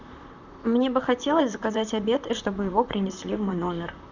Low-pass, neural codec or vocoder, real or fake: 7.2 kHz; vocoder, 44.1 kHz, 128 mel bands, Pupu-Vocoder; fake